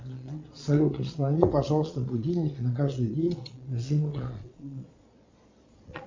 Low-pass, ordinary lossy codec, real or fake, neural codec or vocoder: 7.2 kHz; MP3, 64 kbps; fake; vocoder, 22.05 kHz, 80 mel bands, Vocos